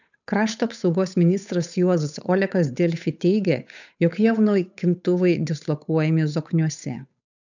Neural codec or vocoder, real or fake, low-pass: codec, 16 kHz, 8 kbps, FunCodec, trained on Chinese and English, 25 frames a second; fake; 7.2 kHz